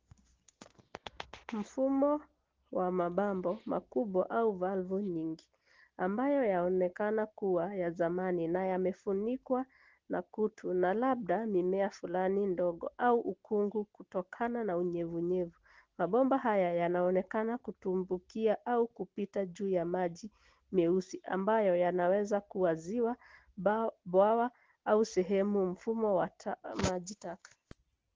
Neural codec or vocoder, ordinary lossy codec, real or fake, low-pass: none; Opus, 16 kbps; real; 7.2 kHz